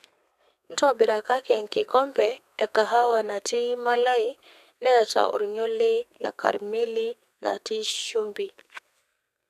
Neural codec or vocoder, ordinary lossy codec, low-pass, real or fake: codec, 32 kHz, 1.9 kbps, SNAC; none; 14.4 kHz; fake